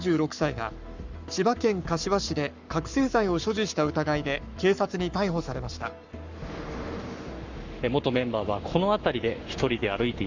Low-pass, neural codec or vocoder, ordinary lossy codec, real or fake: 7.2 kHz; codec, 44.1 kHz, 7.8 kbps, Pupu-Codec; Opus, 64 kbps; fake